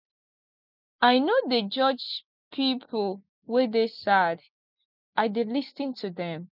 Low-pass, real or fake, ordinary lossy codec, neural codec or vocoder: 5.4 kHz; real; none; none